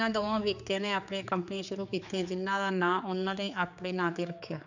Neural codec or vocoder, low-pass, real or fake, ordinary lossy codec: codec, 16 kHz, 4 kbps, X-Codec, HuBERT features, trained on general audio; 7.2 kHz; fake; none